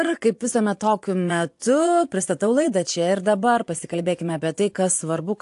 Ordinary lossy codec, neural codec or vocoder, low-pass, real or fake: AAC, 64 kbps; vocoder, 24 kHz, 100 mel bands, Vocos; 10.8 kHz; fake